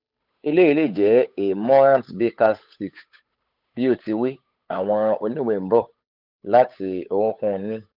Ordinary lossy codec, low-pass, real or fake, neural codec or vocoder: none; 5.4 kHz; fake; codec, 16 kHz, 8 kbps, FunCodec, trained on Chinese and English, 25 frames a second